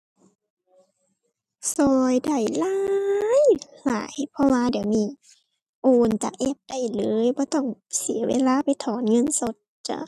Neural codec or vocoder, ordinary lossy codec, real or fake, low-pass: none; none; real; 14.4 kHz